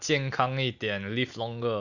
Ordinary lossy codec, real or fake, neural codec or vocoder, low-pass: none; real; none; 7.2 kHz